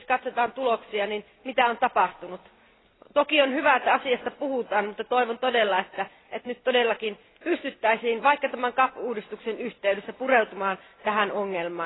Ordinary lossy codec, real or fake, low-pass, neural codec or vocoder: AAC, 16 kbps; real; 7.2 kHz; none